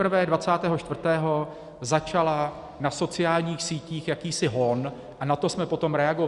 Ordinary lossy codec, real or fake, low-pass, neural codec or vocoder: Opus, 64 kbps; real; 10.8 kHz; none